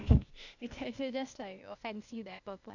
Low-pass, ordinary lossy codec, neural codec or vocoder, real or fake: 7.2 kHz; none; codec, 16 kHz, 0.8 kbps, ZipCodec; fake